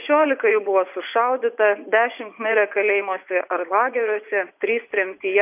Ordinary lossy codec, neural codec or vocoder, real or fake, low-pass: AAC, 32 kbps; vocoder, 24 kHz, 100 mel bands, Vocos; fake; 3.6 kHz